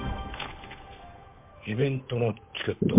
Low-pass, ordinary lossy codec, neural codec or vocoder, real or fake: 3.6 kHz; MP3, 32 kbps; codec, 16 kHz, 8 kbps, FunCodec, trained on Chinese and English, 25 frames a second; fake